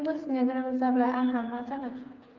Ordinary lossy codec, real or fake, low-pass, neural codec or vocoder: Opus, 24 kbps; fake; 7.2 kHz; codec, 16 kHz, 4 kbps, FreqCodec, smaller model